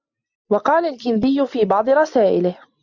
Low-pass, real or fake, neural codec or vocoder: 7.2 kHz; real; none